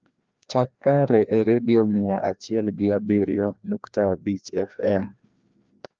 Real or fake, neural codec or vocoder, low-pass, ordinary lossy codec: fake; codec, 16 kHz, 1 kbps, FreqCodec, larger model; 7.2 kHz; Opus, 24 kbps